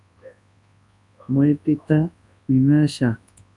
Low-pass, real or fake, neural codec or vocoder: 10.8 kHz; fake; codec, 24 kHz, 0.9 kbps, WavTokenizer, large speech release